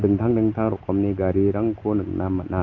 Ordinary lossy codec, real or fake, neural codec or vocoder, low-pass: Opus, 32 kbps; real; none; 7.2 kHz